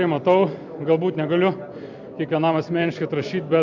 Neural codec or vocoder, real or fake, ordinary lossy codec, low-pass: none; real; MP3, 64 kbps; 7.2 kHz